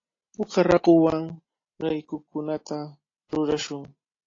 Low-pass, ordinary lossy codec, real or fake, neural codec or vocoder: 7.2 kHz; AAC, 32 kbps; real; none